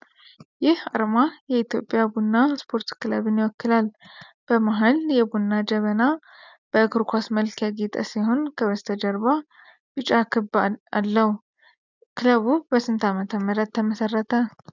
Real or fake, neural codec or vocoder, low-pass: real; none; 7.2 kHz